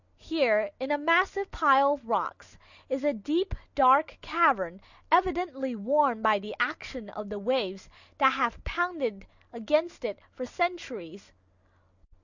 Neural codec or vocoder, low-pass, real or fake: none; 7.2 kHz; real